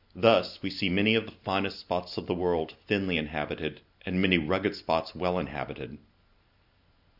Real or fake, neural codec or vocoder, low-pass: real; none; 5.4 kHz